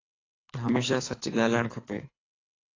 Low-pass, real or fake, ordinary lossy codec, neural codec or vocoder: 7.2 kHz; fake; AAC, 32 kbps; codec, 24 kHz, 3 kbps, HILCodec